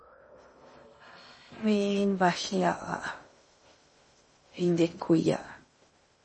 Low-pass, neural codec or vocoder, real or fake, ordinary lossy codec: 10.8 kHz; codec, 16 kHz in and 24 kHz out, 0.6 kbps, FocalCodec, streaming, 2048 codes; fake; MP3, 32 kbps